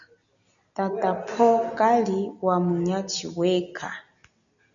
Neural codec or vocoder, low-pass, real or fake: none; 7.2 kHz; real